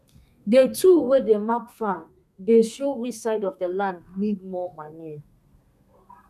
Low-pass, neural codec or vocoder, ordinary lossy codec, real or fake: 14.4 kHz; codec, 32 kHz, 1.9 kbps, SNAC; none; fake